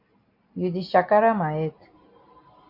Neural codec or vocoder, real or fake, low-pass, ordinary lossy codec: none; real; 5.4 kHz; AAC, 48 kbps